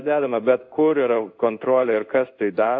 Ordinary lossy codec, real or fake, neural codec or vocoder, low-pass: AAC, 48 kbps; fake; codec, 16 kHz in and 24 kHz out, 1 kbps, XY-Tokenizer; 7.2 kHz